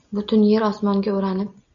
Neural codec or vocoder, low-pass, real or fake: none; 7.2 kHz; real